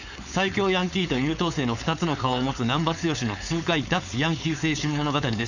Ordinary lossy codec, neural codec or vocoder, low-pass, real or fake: none; codec, 16 kHz, 4.8 kbps, FACodec; 7.2 kHz; fake